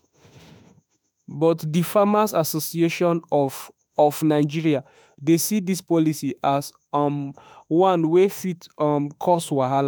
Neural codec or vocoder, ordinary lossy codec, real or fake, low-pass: autoencoder, 48 kHz, 32 numbers a frame, DAC-VAE, trained on Japanese speech; none; fake; none